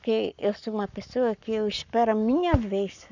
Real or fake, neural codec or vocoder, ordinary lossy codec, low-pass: fake; codec, 44.1 kHz, 7.8 kbps, Pupu-Codec; none; 7.2 kHz